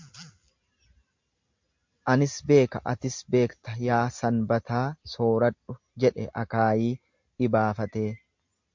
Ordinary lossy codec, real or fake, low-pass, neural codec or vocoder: MP3, 48 kbps; real; 7.2 kHz; none